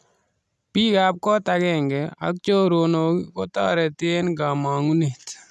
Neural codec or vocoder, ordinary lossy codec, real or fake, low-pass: none; none; real; none